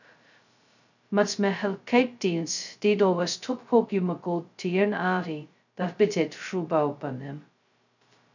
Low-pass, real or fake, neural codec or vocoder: 7.2 kHz; fake; codec, 16 kHz, 0.2 kbps, FocalCodec